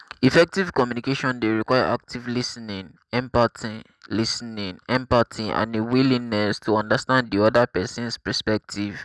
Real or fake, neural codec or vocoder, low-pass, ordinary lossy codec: real; none; none; none